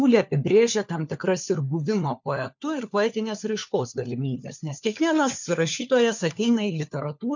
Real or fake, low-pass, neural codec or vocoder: fake; 7.2 kHz; codec, 16 kHz, 4 kbps, FunCodec, trained on LibriTTS, 50 frames a second